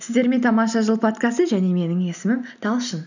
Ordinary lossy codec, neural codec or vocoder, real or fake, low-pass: none; none; real; 7.2 kHz